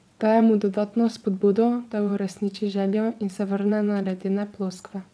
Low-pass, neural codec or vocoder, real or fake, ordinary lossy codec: none; vocoder, 22.05 kHz, 80 mel bands, WaveNeXt; fake; none